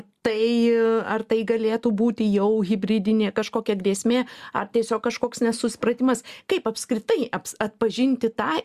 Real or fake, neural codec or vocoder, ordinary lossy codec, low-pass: real; none; Opus, 64 kbps; 14.4 kHz